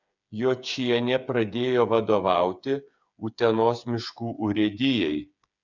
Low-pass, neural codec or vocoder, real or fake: 7.2 kHz; codec, 16 kHz, 8 kbps, FreqCodec, smaller model; fake